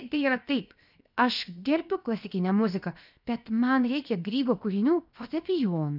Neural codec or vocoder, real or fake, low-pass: codec, 16 kHz, 0.7 kbps, FocalCodec; fake; 5.4 kHz